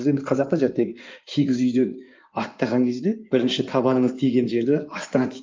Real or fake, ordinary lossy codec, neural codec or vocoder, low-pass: fake; Opus, 24 kbps; codec, 16 kHz in and 24 kHz out, 2.2 kbps, FireRedTTS-2 codec; 7.2 kHz